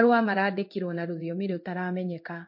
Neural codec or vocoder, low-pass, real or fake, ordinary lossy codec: codec, 16 kHz in and 24 kHz out, 1 kbps, XY-Tokenizer; 5.4 kHz; fake; MP3, 32 kbps